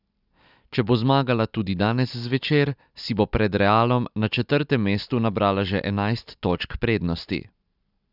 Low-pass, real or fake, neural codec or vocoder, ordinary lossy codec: 5.4 kHz; real; none; none